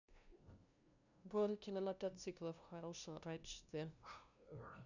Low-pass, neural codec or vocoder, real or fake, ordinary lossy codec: 7.2 kHz; codec, 16 kHz, 0.5 kbps, FunCodec, trained on LibriTTS, 25 frames a second; fake; none